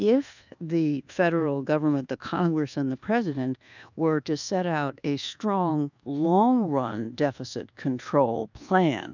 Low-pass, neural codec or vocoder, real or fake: 7.2 kHz; codec, 24 kHz, 1.2 kbps, DualCodec; fake